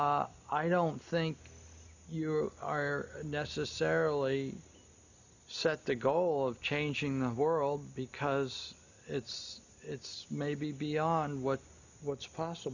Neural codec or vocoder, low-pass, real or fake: none; 7.2 kHz; real